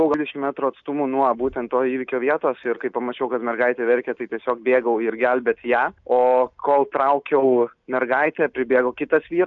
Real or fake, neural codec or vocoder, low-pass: real; none; 7.2 kHz